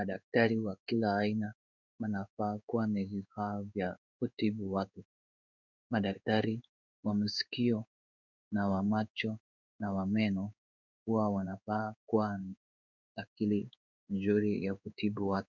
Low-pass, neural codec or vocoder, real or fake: 7.2 kHz; codec, 16 kHz in and 24 kHz out, 1 kbps, XY-Tokenizer; fake